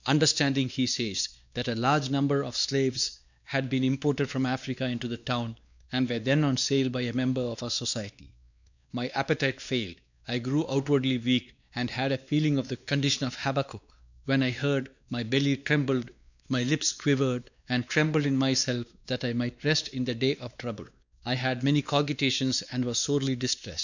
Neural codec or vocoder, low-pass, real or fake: codec, 16 kHz, 2 kbps, X-Codec, WavLM features, trained on Multilingual LibriSpeech; 7.2 kHz; fake